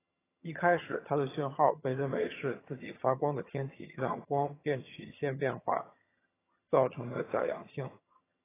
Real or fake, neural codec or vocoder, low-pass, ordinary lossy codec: fake; vocoder, 22.05 kHz, 80 mel bands, HiFi-GAN; 3.6 kHz; AAC, 16 kbps